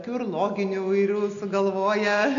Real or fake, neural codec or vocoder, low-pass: real; none; 7.2 kHz